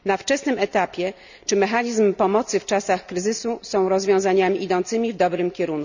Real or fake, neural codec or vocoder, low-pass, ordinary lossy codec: real; none; 7.2 kHz; none